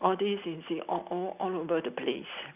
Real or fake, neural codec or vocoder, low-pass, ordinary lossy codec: real; none; 3.6 kHz; none